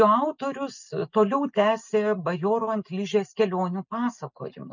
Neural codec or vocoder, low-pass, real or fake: none; 7.2 kHz; real